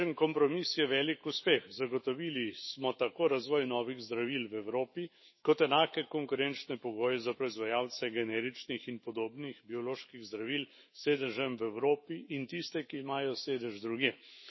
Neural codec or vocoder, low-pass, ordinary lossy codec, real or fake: autoencoder, 48 kHz, 128 numbers a frame, DAC-VAE, trained on Japanese speech; 7.2 kHz; MP3, 24 kbps; fake